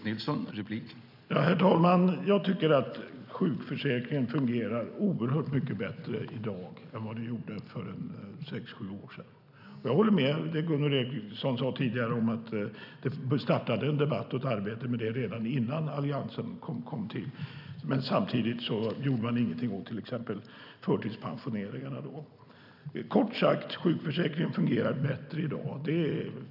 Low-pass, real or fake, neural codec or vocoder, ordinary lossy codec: 5.4 kHz; real; none; none